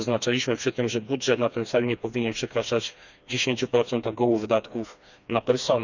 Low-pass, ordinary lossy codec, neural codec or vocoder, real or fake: 7.2 kHz; none; codec, 16 kHz, 2 kbps, FreqCodec, smaller model; fake